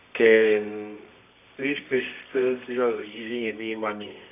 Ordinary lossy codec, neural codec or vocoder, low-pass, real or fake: none; codec, 24 kHz, 0.9 kbps, WavTokenizer, medium music audio release; 3.6 kHz; fake